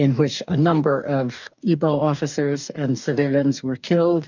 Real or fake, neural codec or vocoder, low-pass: fake; codec, 44.1 kHz, 2.6 kbps, DAC; 7.2 kHz